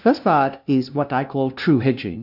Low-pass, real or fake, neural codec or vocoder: 5.4 kHz; fake; codec, 16 kHz, 0.5 kbps, FunCodec, trained on LibriTTS, 25 frames a second